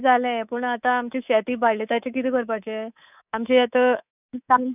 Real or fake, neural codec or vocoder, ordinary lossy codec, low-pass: fake; codec, 24 kHz, 3.1 kbps, DualCodec; none; 3.6 kHz